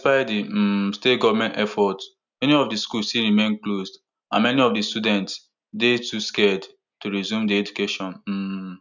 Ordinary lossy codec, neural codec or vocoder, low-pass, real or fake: none; none; 7.2 kHz; real